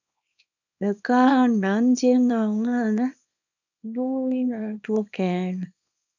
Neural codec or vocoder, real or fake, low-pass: codec, 24 kHz, 0.9 kbps, WavTokenizer, small release; fake; 7.2 kHz